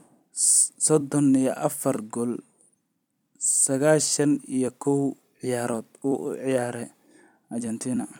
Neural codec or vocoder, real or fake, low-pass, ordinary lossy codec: vocoder, 44.1 kHz, 128 mel bands every 256 samples, BigVGAN v2; fake; 19.8 kHz; none